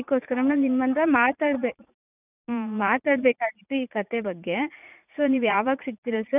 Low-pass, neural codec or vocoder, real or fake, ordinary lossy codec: 3.6 kHz; vocoder, 44.1 kHz, 80 mel bands, Vocos; fake; none